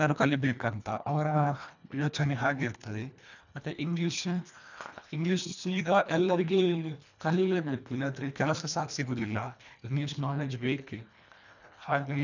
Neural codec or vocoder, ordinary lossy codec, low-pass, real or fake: codec, 24 kHz, 1.5 kbps, HILCodec; none; 7.2 kHz; fake